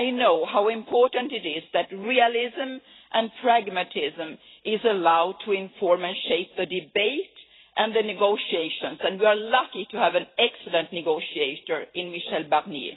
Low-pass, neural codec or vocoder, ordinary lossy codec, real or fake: 7.2 kHz; vocoder, 44.1 kHz, 128 mel bands every 512 samples, BigVGAN v2; AAC, 16 kbps; fake